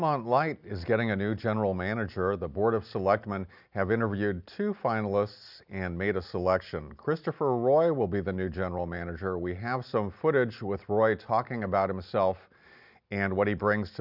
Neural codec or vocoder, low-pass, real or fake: none; 5.4 kHz; real